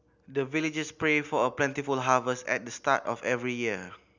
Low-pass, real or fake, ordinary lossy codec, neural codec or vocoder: 7.2 kHz; real; none; none